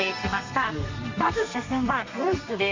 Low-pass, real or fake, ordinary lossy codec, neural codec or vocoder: 7.2 kHz; fake; MP3, 48 kbps; codec, 32 kHz, 1.9 kbps, SNAC